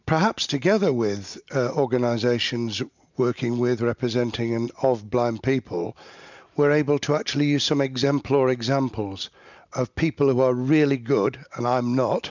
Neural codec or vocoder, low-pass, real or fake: none; 7.2 kHz; real